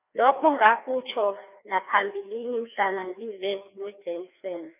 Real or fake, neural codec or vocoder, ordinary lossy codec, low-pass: fake; codec, 16 kHz, 2 kbps, FreqCodec, larger model; none; 3.6 kHz